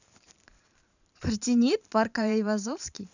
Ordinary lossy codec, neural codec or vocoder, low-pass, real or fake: none; vocoder, 44.1 kHz, 128 mel bands every 512 samples, BigVGAN v2; 7.2 kHz; fake